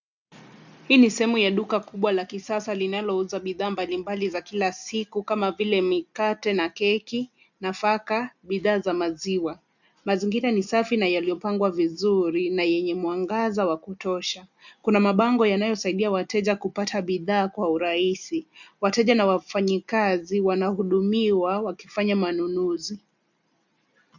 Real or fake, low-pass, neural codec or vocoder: real; 7.2 kHz; none